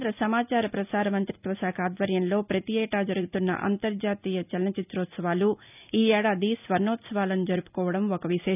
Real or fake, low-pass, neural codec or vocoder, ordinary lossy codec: real; 3.6 kHz; none; none